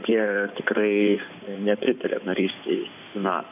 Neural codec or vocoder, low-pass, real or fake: codec, 16 kHz in and 24 kHz out, 2.2 kbps, FireRedTTS-2 codec; 3.6 kHz; fake